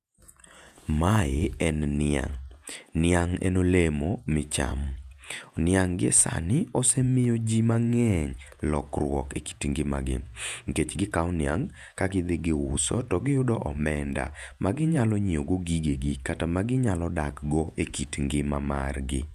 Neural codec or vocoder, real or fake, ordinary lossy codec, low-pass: vocoder, 48 kHz, 128 mel bands, Vocos; fake; none; 14.4 kHz